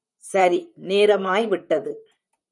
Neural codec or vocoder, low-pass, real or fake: vocoder, 44.1 kHz, 128 mel bands, Pupu-Vocoder; 10.8 kHz; fake